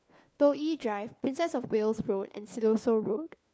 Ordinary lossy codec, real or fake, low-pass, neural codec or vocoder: none; fake; none; codec, 16 kHz, 4 kbps, FunCodec, trained on LibriTTS, 50 frames a second